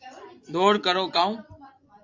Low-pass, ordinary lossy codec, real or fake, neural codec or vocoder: 7.2 kHz; AAC, 48 kbps; fake; vocoder, 24 kHz, 100 mel bands, Vocos